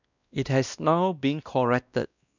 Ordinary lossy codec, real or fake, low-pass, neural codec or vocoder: none; fake; 7.2 kHz; codec, 16 kHz, 1 kbps, X-Codec, WavLM features, trained on Multilingual LibriSpeech